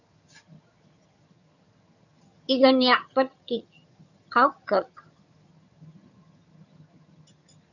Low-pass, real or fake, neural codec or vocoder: 7.2 kHz; fake; vocoder, 22.05 kHz, 80 mel bands, HiFi-GAN